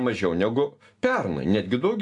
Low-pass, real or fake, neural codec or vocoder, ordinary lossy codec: 10.8 kHz; real; none; AAC, 64 kbps